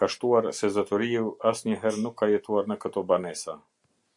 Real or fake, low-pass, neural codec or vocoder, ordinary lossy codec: real; 10.8 kHz; none; MP3, 64 kbps